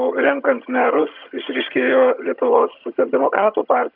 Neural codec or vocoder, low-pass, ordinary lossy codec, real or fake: vocoder, 22.05 kHz, 80 mel bands, HiFi-GAN; 5.4 kHz; AAC, 48 kbps; fake